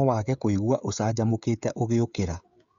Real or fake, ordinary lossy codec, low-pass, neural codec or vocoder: fake; Opus, 64 kbps; 7.2 kHz; codec, 16 kHz, 16 kbps, FreqCodec, smaller model